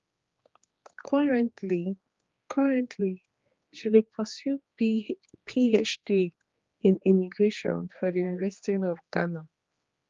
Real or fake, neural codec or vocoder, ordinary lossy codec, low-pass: fake; codec, 16 kHz, 2 kbps, X-Codec, HuBERT features, trained on general audio; Opus, 32 kbps; 7.2 kHz